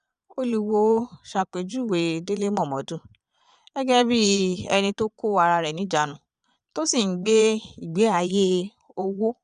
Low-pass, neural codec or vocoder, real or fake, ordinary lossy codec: 9.9 kHz; vocoder, 22.05 kHz, 80 mel bands, Vocos; fake; none